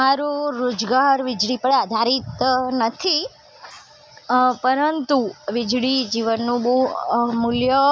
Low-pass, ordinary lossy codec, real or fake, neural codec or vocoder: none; none; real; none